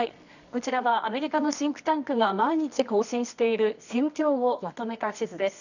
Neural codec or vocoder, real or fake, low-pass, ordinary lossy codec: codec, 24 kHz, 0.9 kbps, WavTokenizer, medium music audio release; fake; 7.2 kHz; none